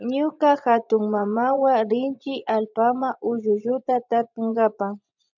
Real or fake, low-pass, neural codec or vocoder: fake; 7.2 kHz; vocoder, 24 kHz, 100 mel bands, Vocos